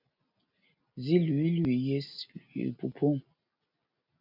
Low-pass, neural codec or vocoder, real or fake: 5.4 kHz; none; real